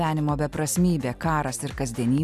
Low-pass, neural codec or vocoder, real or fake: 14.4 kHz; none; real